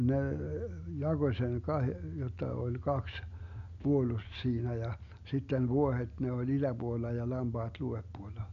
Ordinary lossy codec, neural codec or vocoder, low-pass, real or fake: none; none; 7.2 kHz; real